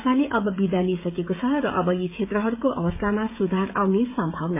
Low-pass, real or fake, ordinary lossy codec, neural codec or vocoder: 3.6 kHz; fake; MP3, 24 kbps; codec, 16 kHz, 16 kbps, FreqCodec, smaller model